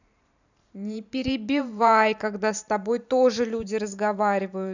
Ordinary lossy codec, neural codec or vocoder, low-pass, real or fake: none; vocoder, 44.1 kHz, 128 mel bands every 512 samples, BigVGAN v2; 7.2 kHz; fake